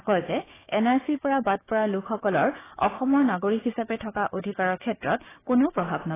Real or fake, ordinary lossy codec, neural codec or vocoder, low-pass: fake; AAC, 16 kbps; codec, 16 kHz, 6 kbps, DAC; 3.6 kHz